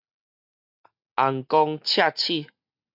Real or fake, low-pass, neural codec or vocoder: real; 5.4 kHz; none